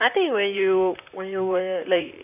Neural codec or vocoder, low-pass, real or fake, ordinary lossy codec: vocoder, 44.1 kHz, 128 mel bands, Pupu-Vocoder; 3.6 kHz; fake; none